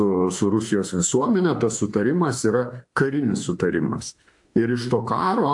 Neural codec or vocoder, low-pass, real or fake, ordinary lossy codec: autoencoder, 48 kHz, 32 numbers a frame, DAC-VAE, trained on Japanese speech; 10.8 kHz; fake; AAC, 64 kbps